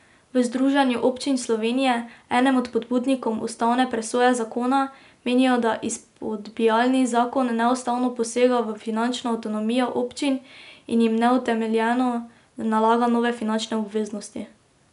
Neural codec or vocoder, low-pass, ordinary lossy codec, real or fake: none; 10.8 kHz; none; real